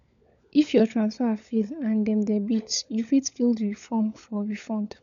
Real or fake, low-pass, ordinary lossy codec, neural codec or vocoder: fake; 7.2 kHz; none; codec, 16 kHz, 16 kbps, FunCodec, trained on LibriTTS, 50 frames a second